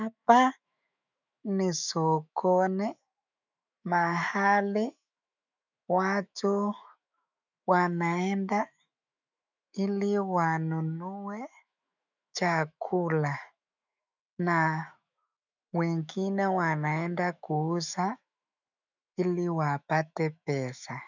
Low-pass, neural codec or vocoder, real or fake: 7.2 kHz; autoencoder, 48 kHz, 128 numbers a frame, DAC-VAE, trained on Japanese speech; fake